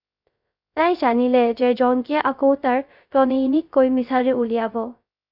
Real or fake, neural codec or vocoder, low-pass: fake; codec, 16 kHz, 0.3 kbps, FocalCodec; 5.4 kHz